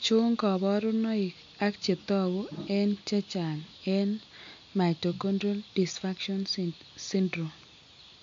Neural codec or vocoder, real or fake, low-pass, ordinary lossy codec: none; real; 7.2 kHz; MP3, 48 kbps